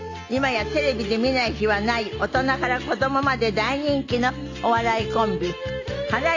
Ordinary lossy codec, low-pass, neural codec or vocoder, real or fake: AAC, 48 kbps; 7.2 kHz; none; real